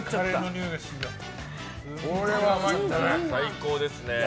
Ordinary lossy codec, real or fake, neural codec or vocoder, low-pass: none; real; none; none